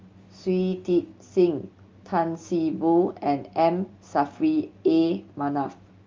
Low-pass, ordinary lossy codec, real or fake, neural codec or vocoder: 7.2 kHz; Opus, 32 kbps; real; none